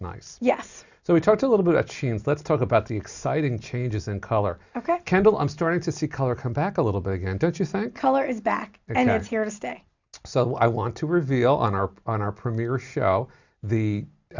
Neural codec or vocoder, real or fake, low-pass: none; real; 7.2 kHz